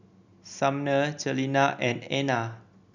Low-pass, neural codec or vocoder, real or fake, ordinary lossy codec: 7.2 kHz; none; real; none